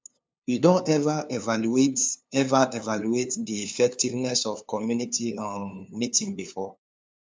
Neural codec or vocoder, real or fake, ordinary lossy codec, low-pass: codec, 16 kHz, 2 kbps, FunCodec, trained on LibriTTS, 25 frames a second; fake; none; none